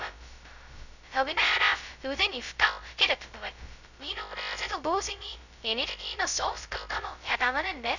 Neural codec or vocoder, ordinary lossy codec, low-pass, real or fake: codec, 16 kHz, 0.2 kbps, FocalCodec; none; 7.2 kHz; fake